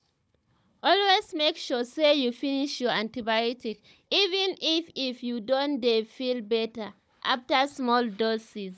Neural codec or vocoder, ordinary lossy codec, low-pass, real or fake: codec, 16 kHz, 16 kbps, FunCodec, trained on Chinese and English, 50 frames a second; none; none; fake